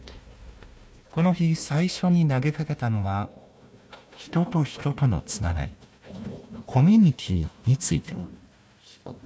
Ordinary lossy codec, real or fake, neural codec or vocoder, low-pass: none; fake; codec, 16 kHz, 1 kbps, FunCodec, trained on Chinese and English, 50 frames a second; none